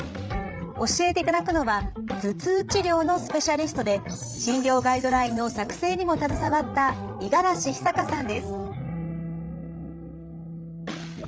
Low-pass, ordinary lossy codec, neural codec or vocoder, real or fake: none; none; codec, 16 kHz, 8 kbps, FreqCodec, larger model; fake